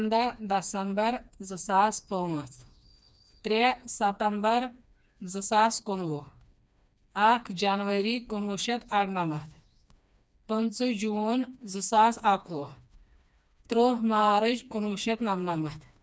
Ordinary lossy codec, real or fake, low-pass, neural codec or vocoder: none; fake; none; codec, 16 kHz, 2 kbps, FreqCodec, smaller model